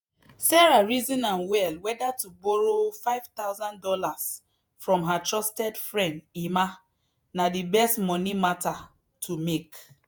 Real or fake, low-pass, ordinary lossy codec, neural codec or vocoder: fake; none; none; vocoder, 48 kHz, 128 mel bands, Vocos